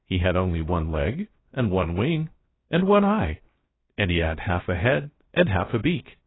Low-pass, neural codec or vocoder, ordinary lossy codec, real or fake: 7.2 kHz; codec, 16 kHz, 0.7 kbps, FocalCodec; AAC, 16 kbps; fake